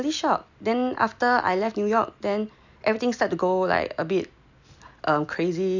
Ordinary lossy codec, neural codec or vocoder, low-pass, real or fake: none; none; 7.2 kHz; real